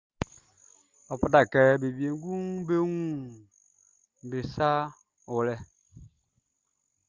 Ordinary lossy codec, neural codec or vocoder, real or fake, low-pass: Opus, 32 kbps; none; real; 7.2 kHz